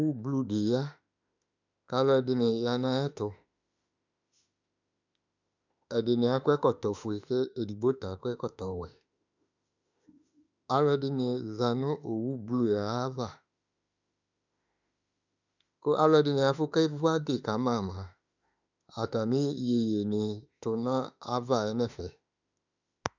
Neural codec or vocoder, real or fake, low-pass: autoencoder, 48 kHz, 32 numbers a frame, DAC-VAE, trained on Japanese speech; fake; 7.2 kHz